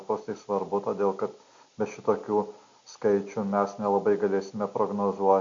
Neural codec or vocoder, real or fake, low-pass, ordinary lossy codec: none; real; 7.2 kHz; MP3, 48 kbps